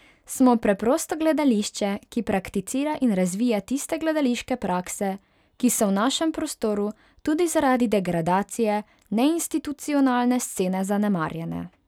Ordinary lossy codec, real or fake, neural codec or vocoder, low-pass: none; real; none; 19.8 kHz